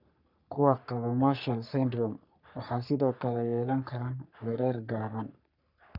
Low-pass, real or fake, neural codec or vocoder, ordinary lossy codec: 5.4 kHz; fake; codec, 44.1 kHz, 3.4 kbps, Pupu-Codec; none